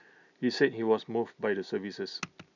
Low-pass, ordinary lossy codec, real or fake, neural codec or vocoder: 7.2 kHz; none; real; none